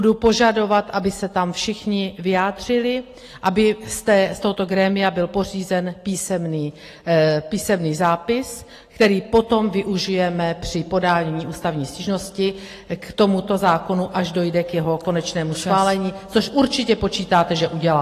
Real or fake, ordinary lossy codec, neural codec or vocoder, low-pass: real; AAC, 48 kbps; none; 14.4 kHz